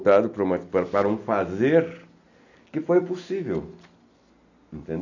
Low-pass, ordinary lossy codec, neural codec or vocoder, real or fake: 7.2 kHz; none; none; real